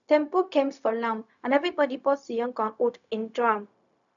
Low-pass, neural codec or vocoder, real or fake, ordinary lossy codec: 7.2 kHz; codec, 16 kHz, 0.4 kbps, LongCat-Audio-Codec; fake; none